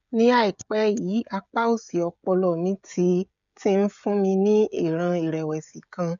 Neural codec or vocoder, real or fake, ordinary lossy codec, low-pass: codec, 16 kHz, 16 kbps, FreqCodec, smaller model; fake; none; 7.2 kHz